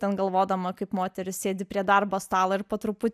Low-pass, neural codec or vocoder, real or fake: 14.4 kHz; none; real